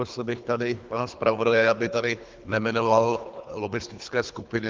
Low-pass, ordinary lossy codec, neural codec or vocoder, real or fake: 7.2 kHz; Opus, 32 kbps; codec, 24 kHz, 3 kbps, HILCodec; fake